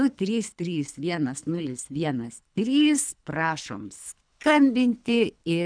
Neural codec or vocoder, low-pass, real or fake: codec, 24 kHz, 3 kbps, HILCodec; 9.9 kHz; fake